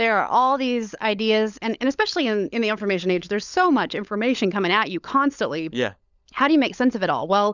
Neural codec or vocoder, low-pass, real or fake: codec, 16 kHz, 16 kbps, FunCodec, trained on LibriTTS, 50 frames a second; 7.2 kHz; fake